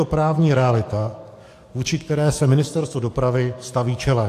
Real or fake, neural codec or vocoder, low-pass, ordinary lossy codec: fake; codec, 44.1 kHz, 7.8 kbps, DAC; 14.4 kHz; AAC, 64 kbps